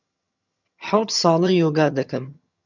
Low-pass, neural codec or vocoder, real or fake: 7.2 kHz; vocoder, 22.05 kHz, 80 mel bands, HiFi-GAN; fake